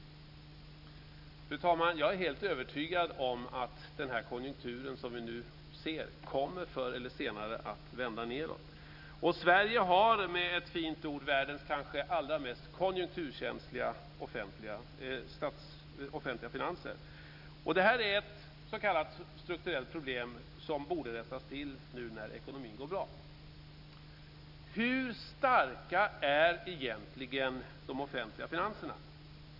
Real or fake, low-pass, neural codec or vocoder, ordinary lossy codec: real; 5.4 kHz; none; none